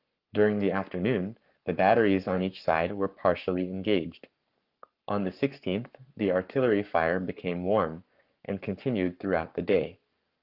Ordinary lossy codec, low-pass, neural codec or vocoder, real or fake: Opus, 24 kbps; 5.4 kHz; codec, 44.1 kHz, 7.8 kbps, Pupu-Codec; fake